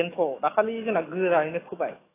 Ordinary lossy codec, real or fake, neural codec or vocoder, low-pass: AAC, 16 kbps; fake; autoencoder, 48 kHz, 128 numbers a frame, DAC-VAE, trained on Japanese speech; 3.6 kHz